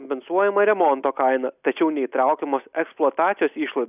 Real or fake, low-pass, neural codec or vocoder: real; 3.6 kHz; none